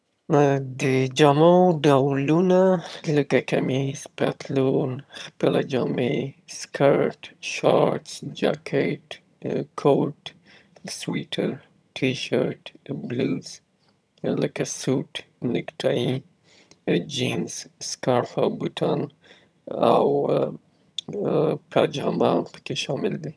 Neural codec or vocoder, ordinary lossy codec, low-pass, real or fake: vocoder, 22.05 kHz, 80 mel bands, HiFi-GAN; none; none; fake